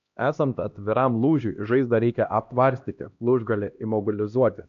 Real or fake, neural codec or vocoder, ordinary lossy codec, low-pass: fake; codec, 16 kHz, 1 kbps, X-Codec, HuBERT features, trained on LibriSpeech; AAC, 64 kbps; 7.2 kHz